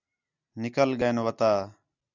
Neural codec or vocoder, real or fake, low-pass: none; real; 7.2 kHz